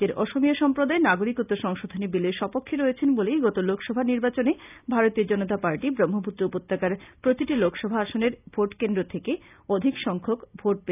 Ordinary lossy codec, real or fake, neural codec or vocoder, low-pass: none; real; none; 3.6 kHz